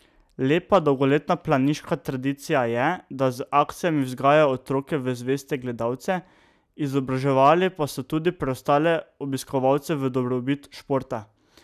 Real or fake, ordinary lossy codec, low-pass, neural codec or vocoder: real; none; 14.4 kHz; none